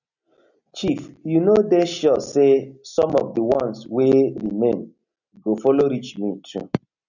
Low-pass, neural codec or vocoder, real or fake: 7.2 kHz; none; real